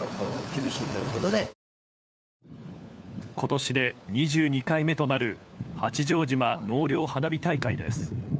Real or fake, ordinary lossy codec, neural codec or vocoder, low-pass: fake; none; codec, 16 kHz, 4 kbps, FunCodec, trained on LibriTTS, 50 frames a second; none